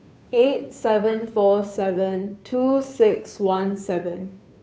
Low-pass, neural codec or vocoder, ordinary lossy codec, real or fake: none; codec, 16 kHz, 2 kbps, FunCodec, trained on Chinese and English, 25 frames a second; none; fake